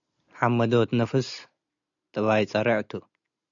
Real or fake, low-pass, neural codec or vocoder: real; 7.2 kHz; none